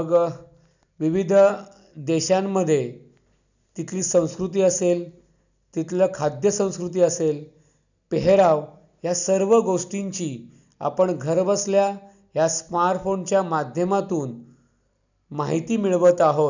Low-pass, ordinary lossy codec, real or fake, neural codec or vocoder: 7.2 kHz; none; real; none